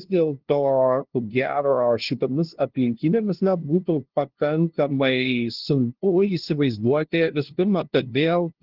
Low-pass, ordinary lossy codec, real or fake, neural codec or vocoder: 5.4 kHz; Opus, 16 kbps; fake; codec, 16 kHz, 0.5 kbps, FunCodec, trained on LibriTTS, 25 frames a second